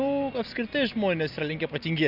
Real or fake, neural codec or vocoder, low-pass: real; none; 5.4 kHz